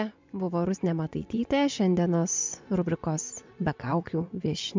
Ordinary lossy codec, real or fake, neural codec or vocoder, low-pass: MP3, 64 kbps; fake; vocoder, 24 kHz, 100 mel bands, Vocos; 7.2 kHz